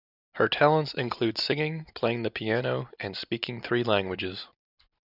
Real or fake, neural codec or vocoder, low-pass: real; none; 5.4 kHz